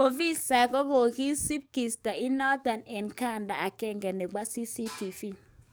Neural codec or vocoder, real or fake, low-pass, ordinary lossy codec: codec, 44.1 kHz, 7.8 kbps, DAC; fake; none; none